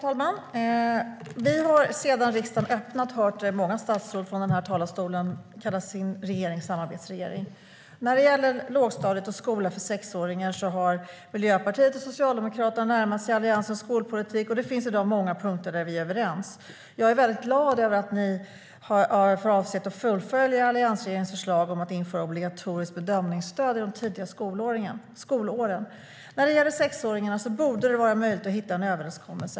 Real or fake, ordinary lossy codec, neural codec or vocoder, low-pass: real; none; none; none